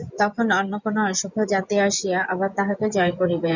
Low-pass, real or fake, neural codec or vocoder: 7.2 kHz; fake; vocoder, 24 kHz, 100 mel bands, Vocos